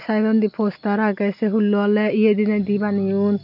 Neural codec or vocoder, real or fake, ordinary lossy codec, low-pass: none; real; none; 5.4 kHz